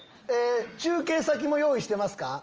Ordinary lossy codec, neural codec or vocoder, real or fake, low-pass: Opus, 24 kbps; none; real; 7.2 kHz